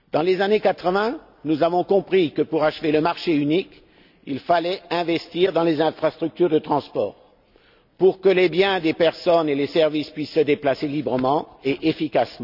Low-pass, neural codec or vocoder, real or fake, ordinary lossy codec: 5.4 kHz; none; real; none